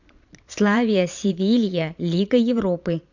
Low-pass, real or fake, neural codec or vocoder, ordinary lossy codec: 7.2 kHz; fake; vocoder, 22.05 kHz, 80 mel bands, Vocos; none